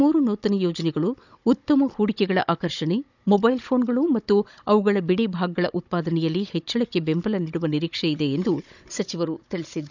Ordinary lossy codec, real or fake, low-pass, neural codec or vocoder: none; fake; 7.2 kHz; codec, 16 kHz, 16 kbps, FunCodec, trained on Chinese and English, 50 frames a second